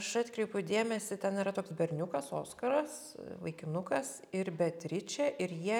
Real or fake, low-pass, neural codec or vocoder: real; 19.8 kHz; none